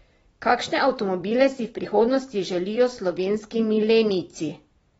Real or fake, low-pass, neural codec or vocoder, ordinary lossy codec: fake; 19.8 kHz; vocoder, 44.1 kHz, 128 mel bands every 256 samples, BigVGAN v2; AAC, 24 kbps